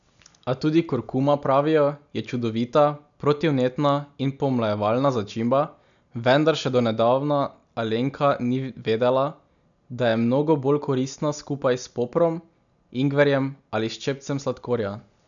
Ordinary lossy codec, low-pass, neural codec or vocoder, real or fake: none; 7.2 kHz; none; real